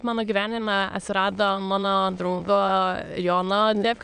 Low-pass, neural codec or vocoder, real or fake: 9.9 kHz; autoencoder, 22.05 kHz, a latent of 192 numbers a frame, VITS, trained on many speakers; fake